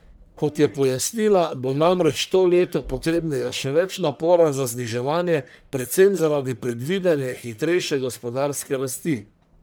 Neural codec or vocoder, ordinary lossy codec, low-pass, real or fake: codec, 44.1 kHz, 1.7 kbps, Pupu-Codec; none; none; fake